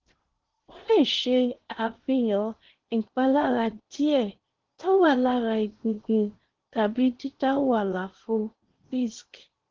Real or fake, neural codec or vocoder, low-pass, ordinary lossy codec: fake; codec, 16 kHz in and 24 kHz out, 0.8 kbps, FocalCodec, streaming, 65536 codes; 7.2 kHz; Opus, 16 kbps